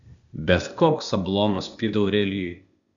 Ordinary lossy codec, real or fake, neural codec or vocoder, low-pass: MP3, 96 kbps; fake; codec, 16 kHz, 0.8 kbps, ZipCodec; 7.2 kHz